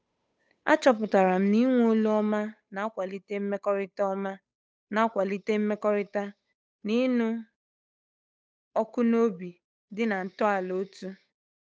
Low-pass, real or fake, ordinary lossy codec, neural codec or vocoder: none; fake; none; codec, 16 kHz, 8 kbps, FunCodec, trained on Chinese and English, 25 frames a second